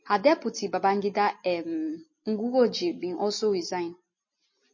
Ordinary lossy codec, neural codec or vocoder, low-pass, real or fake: MP3, 32 kbps; none; 7.2 kHz; real